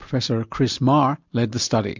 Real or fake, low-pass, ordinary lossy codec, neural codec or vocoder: real; 7.2 kHz; MP3, 64 kbps; none